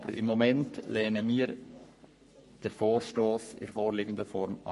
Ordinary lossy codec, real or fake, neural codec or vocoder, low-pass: MP3, 48 kbps; fake; codec, 44.1 kHz, 3.4 kbps, Pupu-Codec; 14.4 kHz